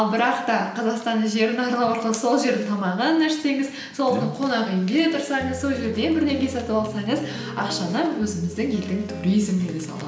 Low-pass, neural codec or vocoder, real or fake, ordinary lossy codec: none; none; real; none